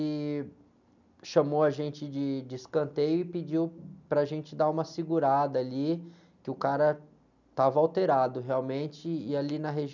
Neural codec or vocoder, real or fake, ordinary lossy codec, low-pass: none; real; none; 7.2 kHz